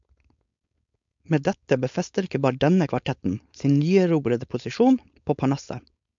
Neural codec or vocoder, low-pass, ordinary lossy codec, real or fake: codec, 16 kHz, 4.8 kbps, FACodec; 7.2 kHz; MP3, 64 kbps; fake